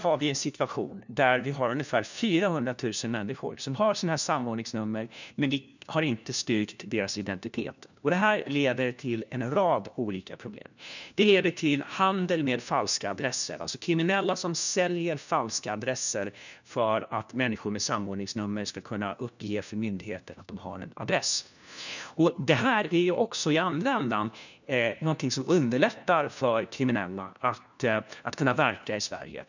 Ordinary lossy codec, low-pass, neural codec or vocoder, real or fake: none; 7.2 kHz; codec, 16 kHz, 1 kbps, FunCodec, trained on LibriTTS, 50 frames a second; fake